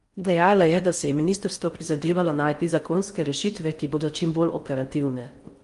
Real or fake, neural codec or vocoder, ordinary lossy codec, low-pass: fake; codec, 16 kHz in and 24 kHz out, 0.6 kbps, FocalCodec, streaming, 4096 codes; Opus, 32 kbps; 10.8 kHz